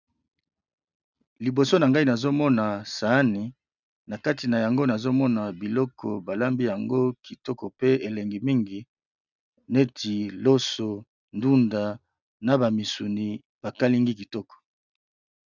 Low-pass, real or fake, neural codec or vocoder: 7.2 kHz; real; none